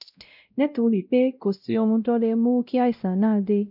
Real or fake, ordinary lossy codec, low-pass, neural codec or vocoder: fake; none; 5.4 kHz; codec, 16 kHz, 0.5 kbps, X-Codec, WavLM features, trained on Multilingual LibriSpeech